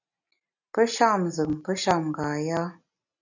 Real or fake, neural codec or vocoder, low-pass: real; none; 7.2 kHz